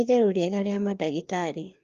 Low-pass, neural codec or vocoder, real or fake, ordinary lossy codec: 7.2 kHz; codec, 16 kHz, 2 kbps, FreqCodec, larger model; fake; Opus, 32 kbps